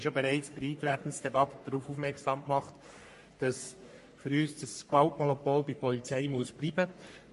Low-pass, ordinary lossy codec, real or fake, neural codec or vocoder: 14.4 kHz; MP3, 48 kbps; fake; codec, 44.1 kHz, 3.4 kbps, Pupu-Codec